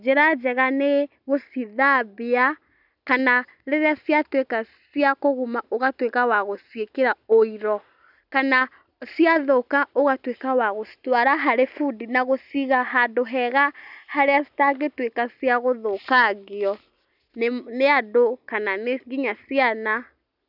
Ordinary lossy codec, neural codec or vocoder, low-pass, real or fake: none; none; 5.4 kHz; real